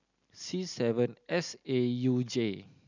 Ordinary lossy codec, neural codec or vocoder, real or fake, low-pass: none; none; real; 7.2 kHz